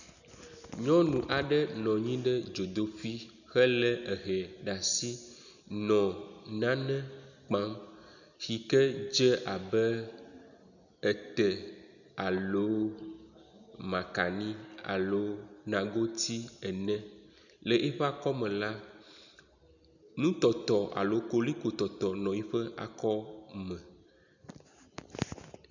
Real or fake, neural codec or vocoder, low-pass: real; none; 7.2 kHz